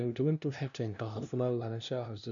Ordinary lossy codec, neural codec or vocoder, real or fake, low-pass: none; codec, 16 kHz, 0.5 kbps, FunCodec, trained on LibriTTS, 25 frames a second; fake; 7.2 kHz